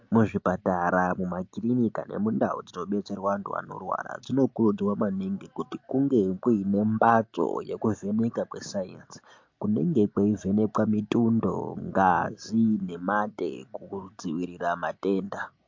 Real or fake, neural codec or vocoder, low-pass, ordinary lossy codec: real; none; 7.2 kHz; MP3, 48 kbps